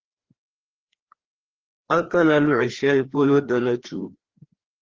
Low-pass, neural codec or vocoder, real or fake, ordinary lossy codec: 7.2 kHz; codec, 16 kHz, 2 kbps, FreqCodec, larger model; fake; Opus, 16 kbps